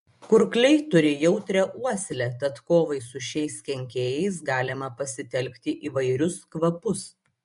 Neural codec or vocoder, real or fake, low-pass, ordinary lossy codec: vocoder, 24 kHz, 100 mel bands, Vocos; fake; 10.8 kHz; MP3, 64 kbps